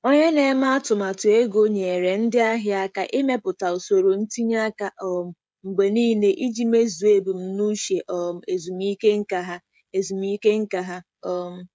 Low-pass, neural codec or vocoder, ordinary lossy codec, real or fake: none; codec, 16 kHz, 16 kbps, FreqCodec, smaller model; none; fake